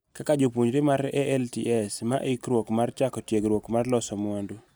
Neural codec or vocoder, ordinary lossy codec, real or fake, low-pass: none; none; real; none